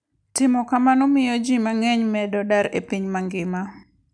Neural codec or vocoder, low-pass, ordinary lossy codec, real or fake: none; 9.9 kHz; none; real